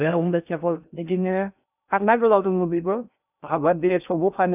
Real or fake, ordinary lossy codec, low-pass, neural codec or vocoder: fake; none; 3.6 kHz; codec, 16 kHz in and 24 kHz out, 0.6 kbps, FocalCodec, streaming, 2048 codes